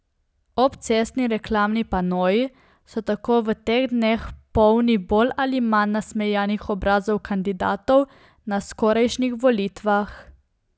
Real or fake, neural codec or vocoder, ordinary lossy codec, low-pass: real; none; none; none